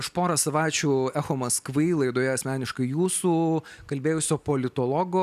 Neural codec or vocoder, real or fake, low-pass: none; real; 14.4 kHz